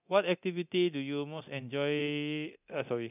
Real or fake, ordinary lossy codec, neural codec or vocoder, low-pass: fake; none; codec, 24 kHz, 0.9 kbps, DualCodec; 3.6 kHz